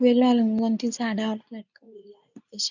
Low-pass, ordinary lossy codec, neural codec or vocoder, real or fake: 7.2 kHz; none; codec, 24 kHz, 0.9 kbps, WavTokenizer, medium speech release version 2; fake